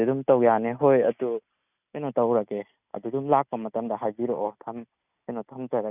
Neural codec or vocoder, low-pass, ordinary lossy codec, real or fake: none; 3.6 kHz; none; real